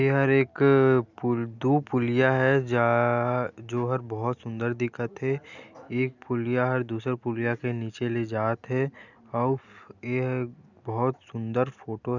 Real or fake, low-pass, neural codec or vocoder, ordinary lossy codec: real; 7.2 kHz; none; none